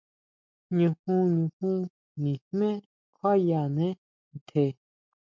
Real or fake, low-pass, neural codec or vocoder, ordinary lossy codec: real; 7.2 kHz; none; MP3, 64 kbps